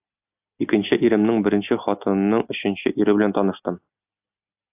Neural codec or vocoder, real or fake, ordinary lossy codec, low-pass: none; real; AAC, 32 kbps; 3.6 kHz